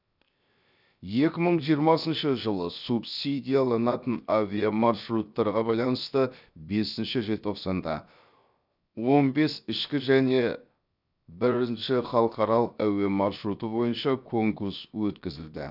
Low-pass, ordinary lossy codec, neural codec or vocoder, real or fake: 5.4 kHz; none; codec, 16 kHz, 0.7 kbps, FocalCodec; fake